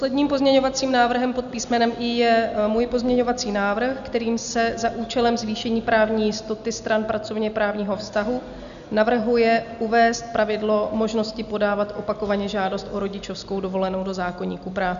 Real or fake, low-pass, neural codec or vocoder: real; 7.2 kHz; none